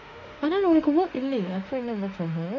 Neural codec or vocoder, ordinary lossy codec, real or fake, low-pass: autoencoder, 48 kHz, 32 numbers a frame, DAC-VAE, trained on Japanese speech; none; fake; 7.2 kHz